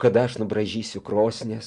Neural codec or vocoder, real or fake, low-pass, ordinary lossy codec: none; real; 10.8 kHz; Opus, 64 kbps